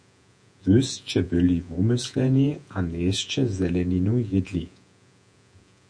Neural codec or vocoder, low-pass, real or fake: vocoder, 48 kHz, 128 mel bands, Vocos; 9.9 kHz; fake